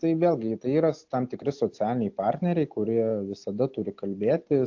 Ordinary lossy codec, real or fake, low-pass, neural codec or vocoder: AAC, 48 kbps; real; 7.2 kHz; none